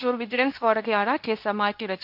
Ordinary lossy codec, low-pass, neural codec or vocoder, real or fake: none; 5.4 kHz; codec, 24 kHz, 0.9 kbps, WavTokenizer, medium speech release version 2; fake